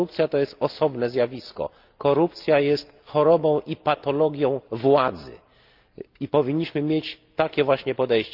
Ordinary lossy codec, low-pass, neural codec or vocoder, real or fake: Opus, 24 kbps; 5.4 kHz; none; real